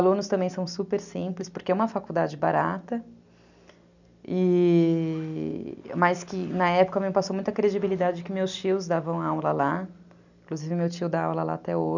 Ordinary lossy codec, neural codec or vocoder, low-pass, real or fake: none; none; 7.2 kHz; real